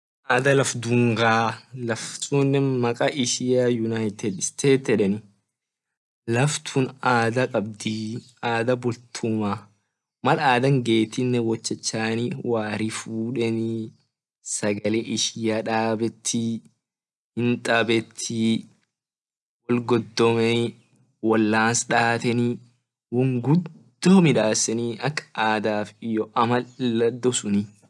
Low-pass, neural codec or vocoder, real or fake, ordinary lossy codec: none; none; real; none